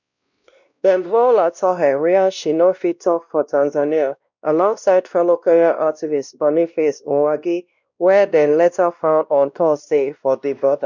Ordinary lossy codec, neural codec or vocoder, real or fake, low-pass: none; codec, 16 kHz, 1 kbps, X-Codec, WavLM features, trained on Multilingual LibriSpeech; fake; 7.2 kHz